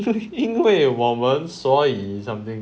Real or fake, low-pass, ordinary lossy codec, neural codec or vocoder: real; none; none; none